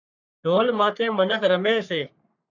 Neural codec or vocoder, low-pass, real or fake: codec, 44.1 kHz, 3.4 kbps, Pupu-Codec; 7.2 kHz; fake